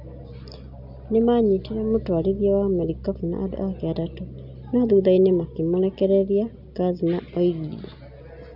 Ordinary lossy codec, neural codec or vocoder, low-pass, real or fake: none; none; 5.4 kHz; real